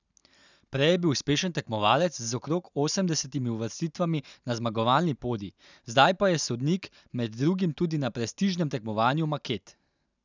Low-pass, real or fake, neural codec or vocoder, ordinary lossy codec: 7.2 kHz; real; none; none